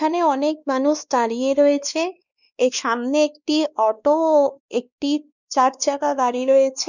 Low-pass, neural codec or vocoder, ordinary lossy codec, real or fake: 7.2 kHz; codec, 16 kHz, 2 kbps, X-Codec, WavLM features, trained on Multilingual LibriSpeech; none; fake